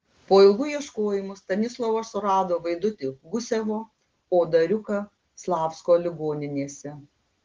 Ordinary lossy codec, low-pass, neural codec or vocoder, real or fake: Opus, 16 kbps; 7.2 kHz; none; real